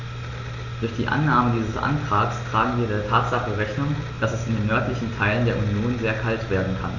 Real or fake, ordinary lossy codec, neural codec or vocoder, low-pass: real; Opus, 64 kbps; none; 7.2 kHz